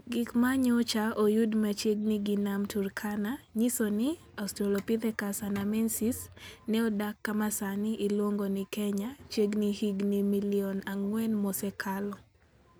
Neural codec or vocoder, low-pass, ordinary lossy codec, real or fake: none; none; none; real